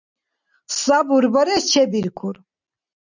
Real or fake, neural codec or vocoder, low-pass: real; none; 7.2 kHz